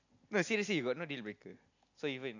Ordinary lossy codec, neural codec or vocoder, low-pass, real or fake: none; none; 7.2 kHz; real